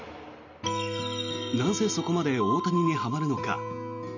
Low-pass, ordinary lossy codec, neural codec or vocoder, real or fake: 7.2 kHz; none; none; real